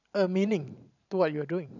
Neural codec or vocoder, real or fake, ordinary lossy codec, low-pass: vocoder, 44.1 kHz, 128 mel bands every 512 samples, BigVGAN v2; fake; none; 7.2 kHz